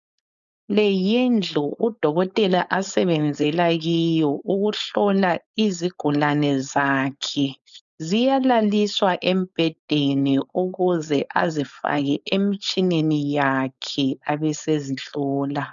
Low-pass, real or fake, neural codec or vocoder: 7.2 kHz; fake; codec, 16 kHz, 4.8 kbps, FACodec